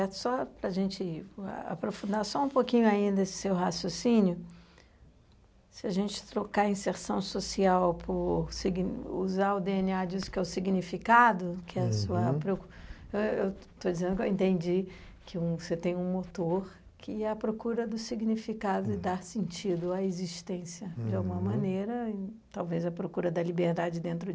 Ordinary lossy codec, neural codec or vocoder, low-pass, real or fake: none; none; none; real